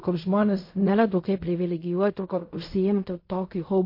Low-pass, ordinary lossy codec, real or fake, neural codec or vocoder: 5.4 kHz; MP3, 32 kbps; fake; codec, 16 kHz in and 24 kHz out, 0.4 kbps, LongCat-Audio-Codec, fine tuned four codebook decoder